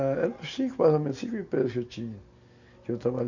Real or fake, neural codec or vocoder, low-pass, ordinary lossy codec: real; none; 7.2 kHz; none